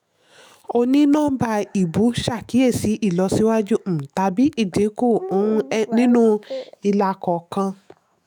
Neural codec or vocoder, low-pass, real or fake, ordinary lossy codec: autoencoder, 48 kHz, 128 numbers a frame, DAC-VAE, trained on Japanese speech; none; fake; none